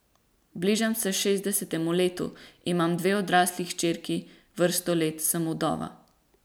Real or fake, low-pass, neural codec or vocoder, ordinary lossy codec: real; none; none; none